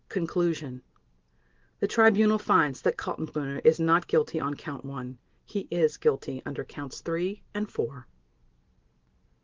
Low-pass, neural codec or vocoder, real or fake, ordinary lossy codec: 7.2 kHz; none; real; Opus, 16 kbps